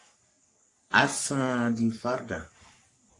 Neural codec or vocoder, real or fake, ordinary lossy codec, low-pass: codec, 44.1 kHz, 3.4 kbps, Pupu-Codec; fake; AAC, 48 kbps; 10.8 kHz